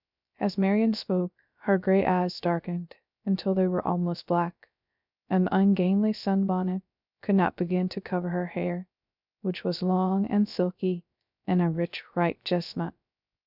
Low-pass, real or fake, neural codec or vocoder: 5.4 kHz; fake; codec, 16 kHz, 0.3 kbps, FocalCodec